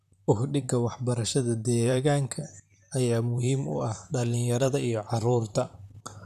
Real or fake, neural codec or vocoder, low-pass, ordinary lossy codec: fake; vocoder, 44.1 kHz, 128 mel bands, Pupu-Vocoder; 14.4 kHz; none